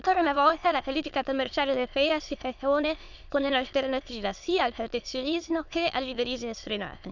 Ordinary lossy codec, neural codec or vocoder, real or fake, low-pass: none; autoencoder, 22.05 kHz, a latent of 192 numbers a frame, VITS, trained on many speakers; fake; 7.2 kHz